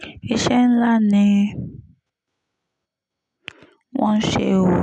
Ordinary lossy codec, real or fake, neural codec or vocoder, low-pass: none; real; none; 10.8 kHz